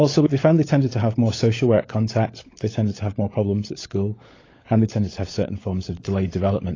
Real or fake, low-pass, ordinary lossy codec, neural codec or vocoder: fake; 7.2 kHz; AAC, 32 kbps; codec, 16 kHz, 16 kbps, FunCodec, trained on LibriTTS, 50 frames a second